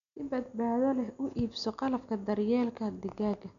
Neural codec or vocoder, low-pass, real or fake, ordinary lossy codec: none; 7.2 kHz; real; none